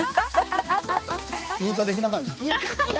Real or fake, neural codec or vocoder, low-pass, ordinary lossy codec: fake; codec, 16 kHz, 2 kbps, X-Codec, HuBERT features, trained on balanced general audio; none; none